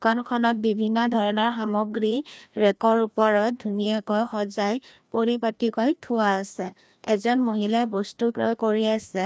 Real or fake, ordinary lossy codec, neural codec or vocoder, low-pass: fake; none; codec, 16 kHz, 1 kbps, FreqCodec, larger model; none